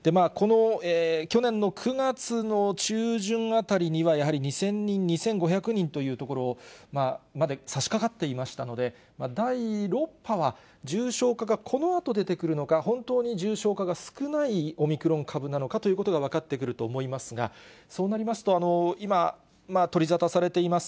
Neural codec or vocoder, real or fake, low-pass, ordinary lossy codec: none; real; none; none